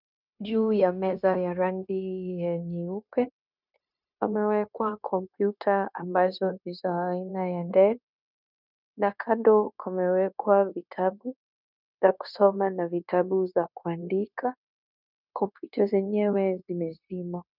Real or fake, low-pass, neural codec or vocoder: fake; 5.4 kHz; codec, 16 kHz, 0.9 kbps, LongCat-Audio-Codec